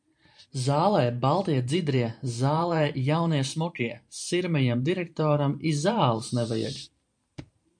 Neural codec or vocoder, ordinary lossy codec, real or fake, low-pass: vocoder, 44.1 kHz, 128 mel bands every 512 samples, BigVGAN v2; MP3, 64 kbps; fake; 9.9 kHz